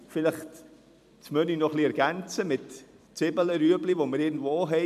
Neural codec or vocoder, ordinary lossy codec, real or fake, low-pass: vocoder, 44.1 kHz, 128 mel bands every 256 samples, BigVGAN v2; MP3, 96 kbps; fake; 14.4 kHz